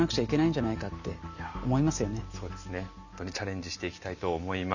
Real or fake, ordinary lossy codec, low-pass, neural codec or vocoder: real; none; 7.2 kHz; none